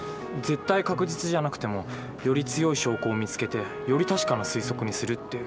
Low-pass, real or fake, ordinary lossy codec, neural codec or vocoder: none; real; none; none